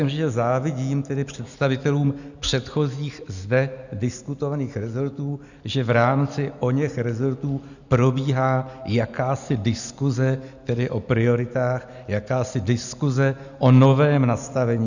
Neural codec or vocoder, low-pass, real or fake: none; 7.2 kHz; real